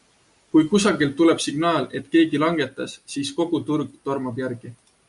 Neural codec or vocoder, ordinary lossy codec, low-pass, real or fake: none; AAC, 96 kbps; 10.8 kHz; real